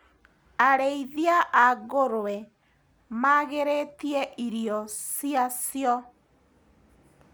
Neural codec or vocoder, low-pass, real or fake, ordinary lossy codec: none; none; real; none